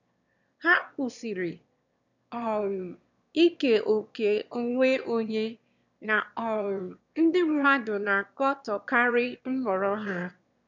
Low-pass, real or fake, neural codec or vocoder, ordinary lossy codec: 7.2 kHz; fake; autoencoder, 22.05 kHz, a latent of 192 numbers a frame, VITS, trained on one speaker; none